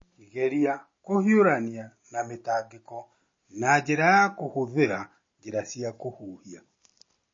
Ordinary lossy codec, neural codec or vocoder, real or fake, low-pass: MP3, 32 kbps; none; real; 7.2 kHz